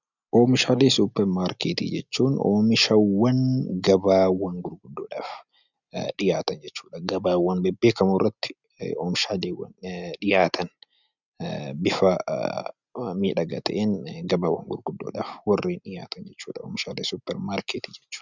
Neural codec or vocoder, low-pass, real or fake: none; 7.2 kHz; real